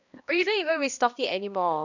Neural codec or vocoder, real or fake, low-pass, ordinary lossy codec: codec, 16 kHz, 1 kbps, X-Codec, HuBERT features, trained on balanced general audio; fake; 7.2 kHz; none